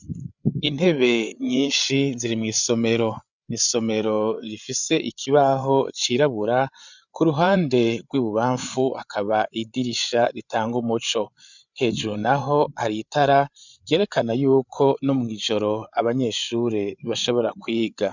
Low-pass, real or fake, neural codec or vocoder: 7.2 kHz; fake; codec, 16 kHz, 8 kbps, FreqCodec, larger model